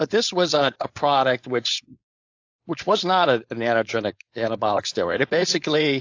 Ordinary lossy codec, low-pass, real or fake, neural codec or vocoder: AAC, 48 kbps; 7.2 kHz; fake; codec, 16 kHz, 4.8 kbps, FACodec